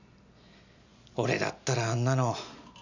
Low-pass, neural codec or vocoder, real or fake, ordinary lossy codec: 7.2 kHz; none; real; none